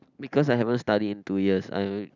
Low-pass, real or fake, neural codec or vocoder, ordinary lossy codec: 7.2 kHz; real; none; none